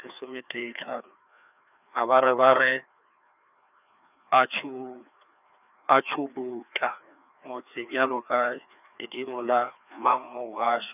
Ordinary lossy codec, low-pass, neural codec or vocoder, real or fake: none; 3.6 kHz; codec, 16 kHz, 2 kbps, FreqCodec, larger model; fake